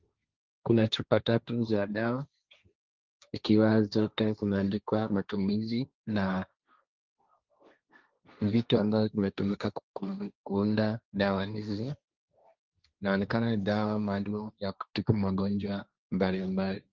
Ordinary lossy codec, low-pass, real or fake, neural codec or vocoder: Opus, 32 kbps; 7.2 kHz; fake; codec, 16 kHz, 1.1 kbps, Voila-Tokenizer